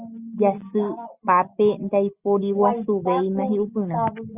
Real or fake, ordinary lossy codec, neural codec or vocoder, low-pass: real; Opus, 64 kbps; none; 3.6 kHz